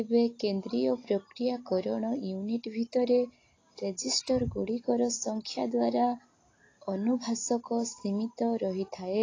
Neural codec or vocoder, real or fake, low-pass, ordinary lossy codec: none; real; 7.2 kHz; AAC, 32 kbps